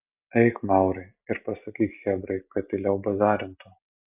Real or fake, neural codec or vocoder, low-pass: real; none; 3.6 kHz